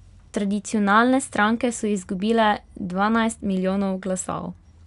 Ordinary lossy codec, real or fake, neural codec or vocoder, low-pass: none; real; none; 10.8 kHz